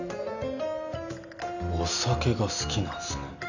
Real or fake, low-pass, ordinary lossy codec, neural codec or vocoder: real; 7.2 kHz; none; none